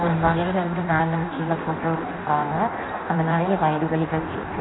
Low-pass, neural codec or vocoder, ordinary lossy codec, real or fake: 7.2 kHz; codec, 16 kHz in and 24 kHz out, 0.6 kbps, FireRedTTS-2 codec; AAC, 16 kbps; fake